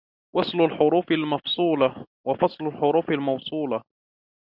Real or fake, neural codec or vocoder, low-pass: real; none; 5.4 kHz